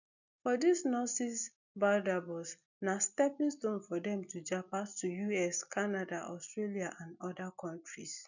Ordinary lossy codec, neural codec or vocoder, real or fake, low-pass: none; none; real; 7.2 kHz